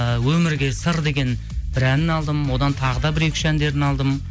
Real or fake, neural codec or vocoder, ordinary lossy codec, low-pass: real; none; none; none